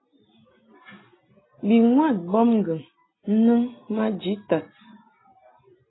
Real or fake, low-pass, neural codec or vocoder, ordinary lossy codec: real; 7.2 kHz; none; AAC, 16 kbps